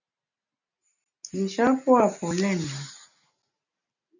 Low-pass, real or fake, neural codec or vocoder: 7.2 kHz; real; none